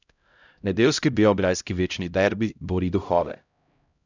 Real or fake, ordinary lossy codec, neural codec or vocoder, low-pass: fake; none; codec, 16 kHz, 0.5 kbps, X-Codec, HuBERT features, trained on LibriSpeech; 7.2 kHz